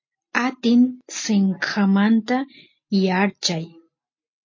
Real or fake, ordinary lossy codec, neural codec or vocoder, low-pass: real; MP3, 32 kbps; none; 7.2 kHz